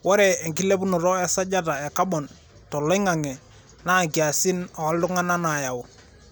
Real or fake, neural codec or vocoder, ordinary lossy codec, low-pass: real; none; none; none